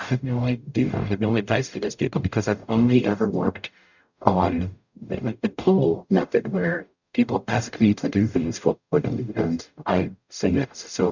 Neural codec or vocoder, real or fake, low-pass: codec, 44.1 kHz, 0.9 kbps, DAC; fake; 7.2 kHz